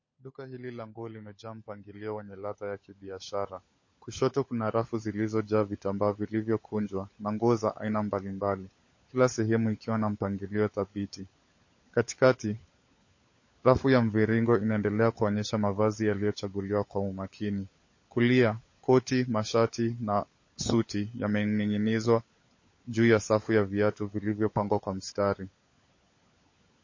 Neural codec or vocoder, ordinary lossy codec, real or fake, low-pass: codec, 16 kHz, 16 kbps, FunCodec, trained on LibriTTS, 50 frames a second; MP3, 32 kbps; fake; 7.2 kHz